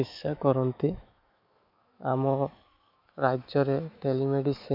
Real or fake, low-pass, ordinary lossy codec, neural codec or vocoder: fake; 5.4 kHz; MP3, 48 kbps; autoencoder, 48 kHz, 128 numbers a frame, DAC-VAE, trained on Japanese speech